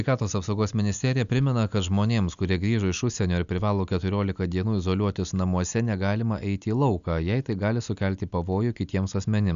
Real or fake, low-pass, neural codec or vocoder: real; 7.2 kHz; none